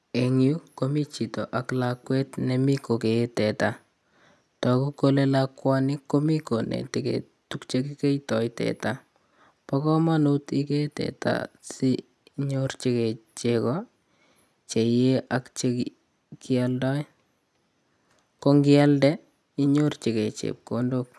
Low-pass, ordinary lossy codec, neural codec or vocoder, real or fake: none; none; none; real